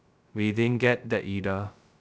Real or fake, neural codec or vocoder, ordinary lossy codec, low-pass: fake; codec, 16 kHz, 0.2 kbps, FocalCodec; none; none